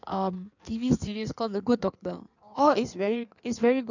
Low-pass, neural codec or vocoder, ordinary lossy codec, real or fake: 7.2 kHz; codec, 16 kHz in and 24 kHz out, 1.1 kbps, FireRedTTS-2 codec; none; fake